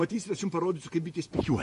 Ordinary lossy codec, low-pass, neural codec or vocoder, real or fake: MP3, 48 kbps; 14.4 kHz; vocoder, 48 kHz, 128 mel bands, Vocos; fake